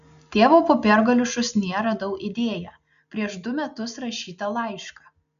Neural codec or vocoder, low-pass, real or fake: none; 7.2 kHz; real